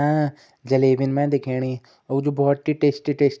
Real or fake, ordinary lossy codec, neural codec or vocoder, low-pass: real; none; none; none